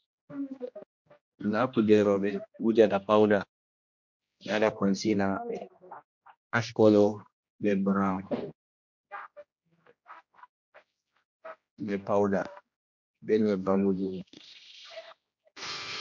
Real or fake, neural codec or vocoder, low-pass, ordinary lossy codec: fake; codec, 16 kHz, 1 kbps, X-Codec, HuBERT features, trained on general audio; 7.2 kHz; MP3, 48 kbps